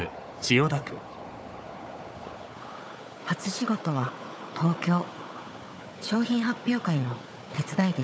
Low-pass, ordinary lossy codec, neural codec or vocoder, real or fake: none; none; codec, 16 kHz, 4 kbps, FunCodec, trained on Chinese and English, 50 frames a second; fake